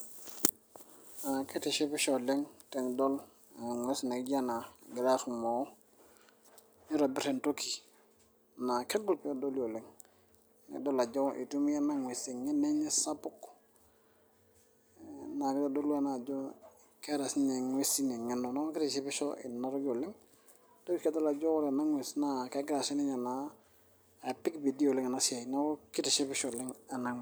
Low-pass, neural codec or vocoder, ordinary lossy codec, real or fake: none; none; none; real